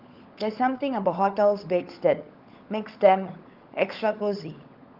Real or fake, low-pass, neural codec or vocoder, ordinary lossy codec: fake; 5.4 kHz; codec, 16 kHz, 16 kbps, FunCodec, trained on LibriTTS, 50 frames a second; Opus, 24 kbps